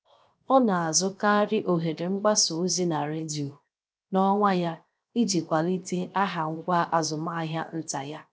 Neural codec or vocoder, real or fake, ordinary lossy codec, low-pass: codec, 16 kHz, 0.7 kbps, FocalCodec; fake; none; none